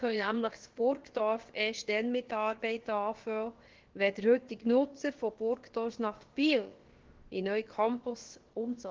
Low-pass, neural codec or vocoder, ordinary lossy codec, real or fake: 7.2 kHz; codec, 16 kHz, about 1 kbps, DyCAST, with the encoder's durations; Opus, 16 kbps; fake